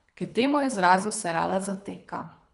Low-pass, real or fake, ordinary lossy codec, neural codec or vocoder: 10.8 kHz; fake; none; codec, 24 kHz, 3 kbps, HILCodec